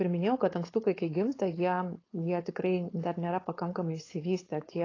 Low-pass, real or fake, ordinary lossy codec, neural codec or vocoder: 7.2 kHz; fake; AAC, 32 kbps; codec, 16 kHz, 4.8 kbps, FACodec